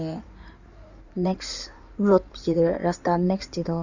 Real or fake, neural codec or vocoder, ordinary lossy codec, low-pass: fake; codec, 16 kHz in and 24 kHz out, 2.2 kbps, FireRedTTS-2 codec; none; 7.2 kHz